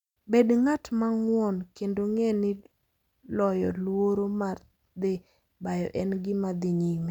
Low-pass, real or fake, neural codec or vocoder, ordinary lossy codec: 19.8 kHz; real; none; none